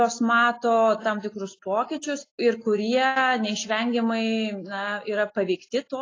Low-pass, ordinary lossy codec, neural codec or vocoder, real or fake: 7.2 kHz; AAC, 32 kbps; none; real